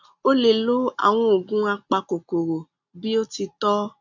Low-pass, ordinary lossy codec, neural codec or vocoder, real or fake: 7.2 kHz; none; none; real